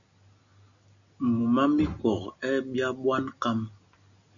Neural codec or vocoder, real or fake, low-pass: none; real; 7.2 kHz